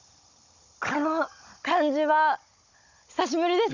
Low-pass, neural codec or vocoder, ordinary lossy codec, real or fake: 7.2 kHz; codec, 16 kHz, 16 kbps, FunCodec, trained on Chinese and English, 50 frames a second; none; fake